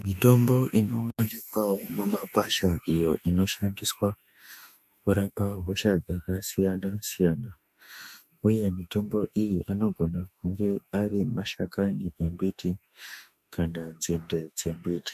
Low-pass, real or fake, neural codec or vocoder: 14.4 kHz; fake; autoencoder, 48 kHz, 32 numbers a frame, DAC-VAE, trained on Japanese speech